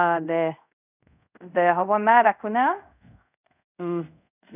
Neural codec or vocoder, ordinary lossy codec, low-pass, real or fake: codec, 24 kHz, 0.5 kbps, DualCodec; none; 3.6 kHz; fake